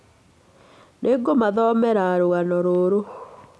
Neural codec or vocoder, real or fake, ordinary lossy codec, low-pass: none; real; none; none